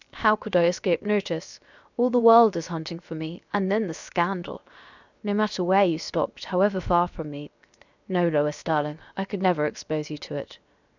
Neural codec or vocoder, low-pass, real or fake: codec, 16 kHz, 0.7 kbps, FocalCodec; 7.2 kHz; fake